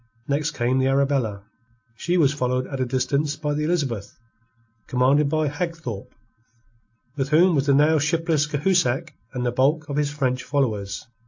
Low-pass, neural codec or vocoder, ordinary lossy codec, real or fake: 7.2 kHz; none; AAC, 48 kbps; real